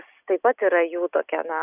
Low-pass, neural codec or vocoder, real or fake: 3.6 kHz; none; real